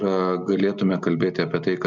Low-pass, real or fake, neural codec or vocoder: 7.2 kHz; real; none